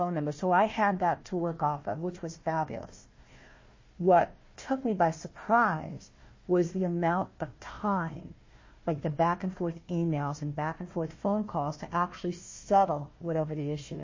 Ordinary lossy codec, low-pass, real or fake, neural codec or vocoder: MP3, 32 kbps; 7.2 kHz; fake; codec, 16 kHz, 1 kbps, FunCodec, trained on Chinese and English, 50 frames a second